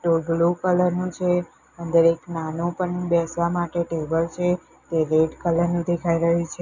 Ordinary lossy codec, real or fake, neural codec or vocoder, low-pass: none; real; none; 7.2 kHz